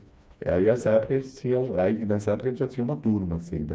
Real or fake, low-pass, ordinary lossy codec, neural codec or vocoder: fake; none; none; codec, 16 kHz, 2 kbps, FreqCodec, smaller model